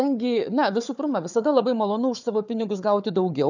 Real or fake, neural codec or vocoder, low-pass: fake; codec, 16 kHz, 4 kbps, FunCodec, trained on Chinese and English, 50 frames a second; 7.2 kHz